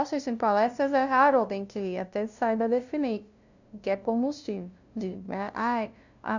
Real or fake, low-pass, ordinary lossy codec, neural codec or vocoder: fake; 7.2 kHz; none; codec, 16 kHz, 0.5 kbps, FunCodec, trained on LibriTTS, 25 frames a second